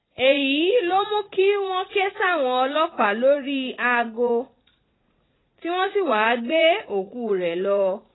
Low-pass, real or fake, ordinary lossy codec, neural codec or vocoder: 7.2 kHz; fake; AAC, 16 kbps; vocoder, 44.1 kHz, 80 mel bands, Vocos